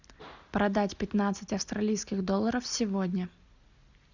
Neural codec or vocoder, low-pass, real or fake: none; 7.2 kHz; real